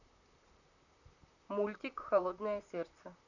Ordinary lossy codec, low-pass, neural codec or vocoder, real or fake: none; 7.2 kHz; vocoder, 44.1 kHz, 128 mel bands, Pupu-Vocoder; fake